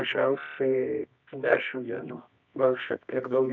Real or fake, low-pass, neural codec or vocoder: fake; 7.2 kHz; codec, 24 kHz, 0.9 kbps, WavTokenizer, medium music audio release